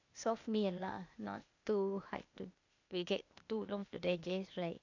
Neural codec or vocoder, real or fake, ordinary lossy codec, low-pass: codec, 16 kHz, 0.8 kbps, ZipCodec; fake; none; 7.2 kHz